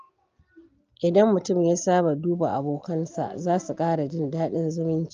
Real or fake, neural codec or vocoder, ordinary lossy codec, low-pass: real; none; Opus, 24 kbps; 7.2 kHz